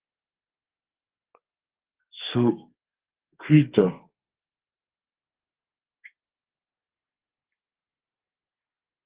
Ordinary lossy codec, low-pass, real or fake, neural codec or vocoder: Opus, 16 kbps; 3.6 kHz; fake; codec, 32 kHz, 1.9 kbps, SNAC